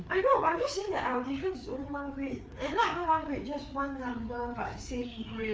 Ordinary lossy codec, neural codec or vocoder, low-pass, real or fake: none; codec, 16 kHz, 4 kbps, FreqCodec, larger model; none; fake